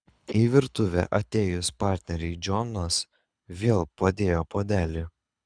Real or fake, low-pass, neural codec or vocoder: fake; 9.9 kHz; codec, 24 kHz, 6 kbps, HILCodec